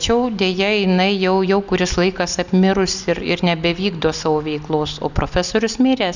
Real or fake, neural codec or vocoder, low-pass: real; none; 7.2 kHz